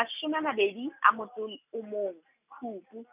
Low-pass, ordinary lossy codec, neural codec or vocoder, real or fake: 3.6 kHz; none; none; real